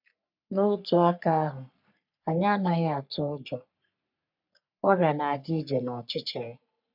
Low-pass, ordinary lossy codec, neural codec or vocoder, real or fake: 5.4 kHz; none; codec, 44.1 kHz, 3.4 kbps, Pupu-Codec; fake